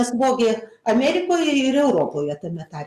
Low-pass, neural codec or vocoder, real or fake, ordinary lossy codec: 14.4 kHz; none; real; Opus, 64 kbps